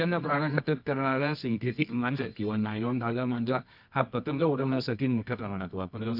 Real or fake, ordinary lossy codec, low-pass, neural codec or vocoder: fake; none; 5.4 kHz; codec, 24 kHz, 0.9 kbps, WavTokenizer, medium music audio release